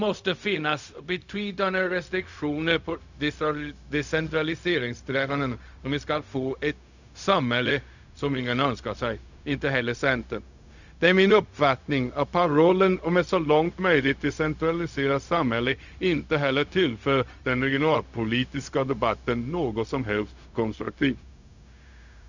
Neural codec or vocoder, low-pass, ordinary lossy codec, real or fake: codec, 16 kHz, 0.4 kbps, LongCat-Audio-Codec; 7.2 kHz; none; fake